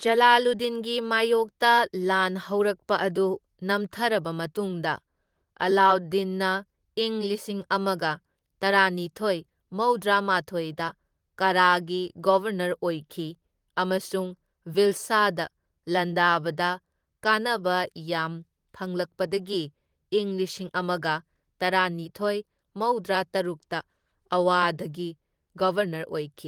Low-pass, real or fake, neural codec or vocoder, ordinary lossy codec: 19.8 kHz; fake; vocoder, 44.1 kHz, 128 mel bands, Pupu-Vocoder; Opus, 24 kbps